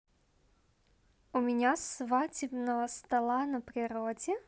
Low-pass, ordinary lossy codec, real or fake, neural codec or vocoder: none; none; real; none